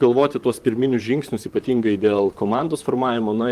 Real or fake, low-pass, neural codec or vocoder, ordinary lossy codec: fake; 14.4 kHz; vocoder, 44.1 kHz, 128 mel bands, Pupu-Vocoder; Opus, 32 kbps